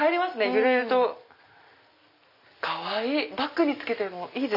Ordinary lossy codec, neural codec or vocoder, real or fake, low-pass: none; none; real; 5.4 kHz